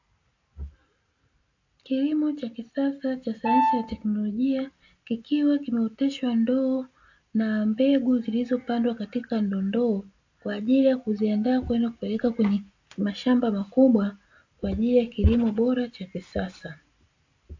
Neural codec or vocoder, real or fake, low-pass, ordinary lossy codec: none; real; 7.2 kHz; AAC, 48 kbps